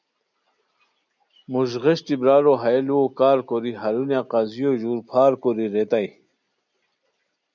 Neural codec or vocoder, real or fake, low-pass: none; real; 7.2 kHz